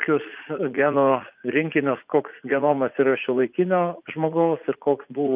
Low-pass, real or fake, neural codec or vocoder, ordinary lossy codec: 3.6 kHz; fake; vocoder, 44.1 kHz, 80 mel bands, Vocos; Opus, 32 kbps